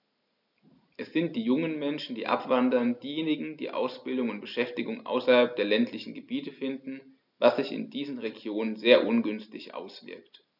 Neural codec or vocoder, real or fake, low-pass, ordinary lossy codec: none; real; 5.4 kHz; none